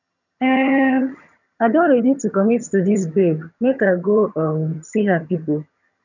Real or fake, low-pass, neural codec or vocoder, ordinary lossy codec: fake; 7.2 kHz; vocoder, 22.05 kHz, 80 mel bands, HiFi-GAN; none